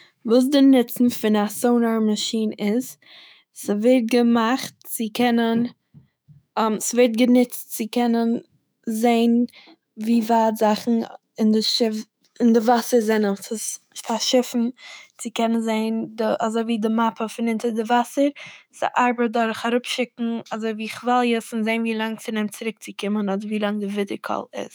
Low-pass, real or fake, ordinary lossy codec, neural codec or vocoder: none; fake; none; autoencoder, 48 kHz, 128 numbers a frame, DAC-VAE, trained on Japanese speech